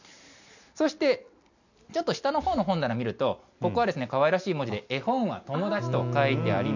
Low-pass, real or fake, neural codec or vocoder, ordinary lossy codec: 7.2 kHz; real; none; none